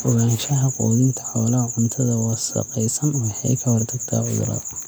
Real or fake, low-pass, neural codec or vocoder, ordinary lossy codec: real; none; none; none